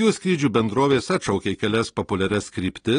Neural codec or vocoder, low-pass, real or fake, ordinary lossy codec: none; 9.9 kHz; real; AAC, 32 kbps